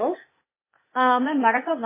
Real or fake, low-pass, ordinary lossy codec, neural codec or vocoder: fake; 3.6 kHz; MP3, 16 kbps; codec, 16 kHz, 0.8 kbps, ZipCodec